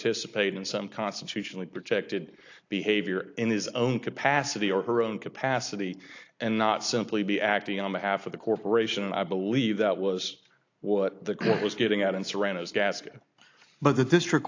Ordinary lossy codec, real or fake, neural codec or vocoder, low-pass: AAC, 48 kbps; real; none; 7.2 kHz